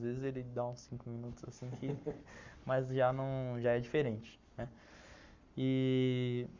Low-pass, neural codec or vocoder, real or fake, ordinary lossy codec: 7.2 kHz; codec, 44.1 kHz, 7.8 kbps, Pupu-Codec; fake; Opus, 64 kbps